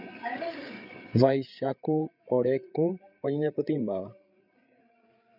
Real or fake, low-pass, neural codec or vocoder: fake; 5.4 kHz; codec, 16 kHz, 8 kbps, FreqCodec, larger model